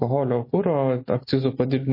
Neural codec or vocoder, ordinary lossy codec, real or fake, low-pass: none; MP3, 24 kbps; real; 5.4 kHz